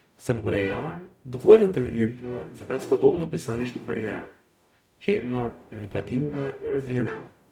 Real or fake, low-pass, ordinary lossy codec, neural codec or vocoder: fake; 19.8 kHz; none; codec, 44.1 kHz, 0.9 kbps, DAC